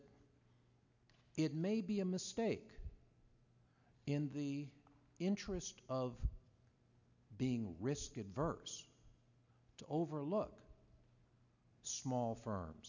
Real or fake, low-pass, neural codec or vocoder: real; 7.2 kHz; none